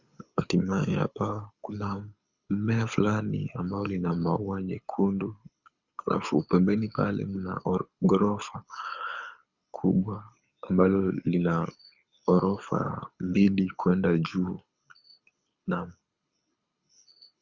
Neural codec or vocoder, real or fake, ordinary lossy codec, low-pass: codec, 24 kHz, 6 kbps, HILCodec; fake; Opus, 64 kbps; 7.2 kHz